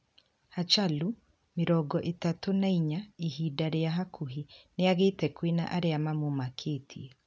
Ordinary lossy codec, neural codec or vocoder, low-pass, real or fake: none; none; none; real